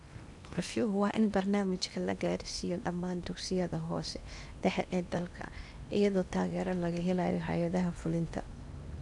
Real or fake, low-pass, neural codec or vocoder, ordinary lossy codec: fake; 10.8 kHz; codec, 16 kHz in and 24 kHz out, 0.8 kbps, FocalCodec, streaming, 65536 codes; none